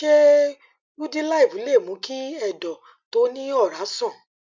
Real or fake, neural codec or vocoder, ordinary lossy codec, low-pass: real; none; MP3, 64 kbps; 7.2 kHz